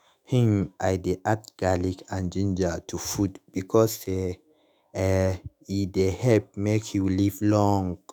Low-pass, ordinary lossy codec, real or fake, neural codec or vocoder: none; none; fake; autoencoder, 48 kHz, 128 numbers a frame, DAC-VAE, trained on Japanese speech